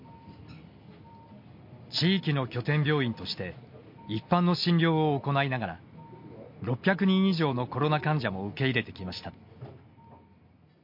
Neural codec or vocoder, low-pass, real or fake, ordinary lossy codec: none; 5.4 kHz; real; MP3, 32 kbps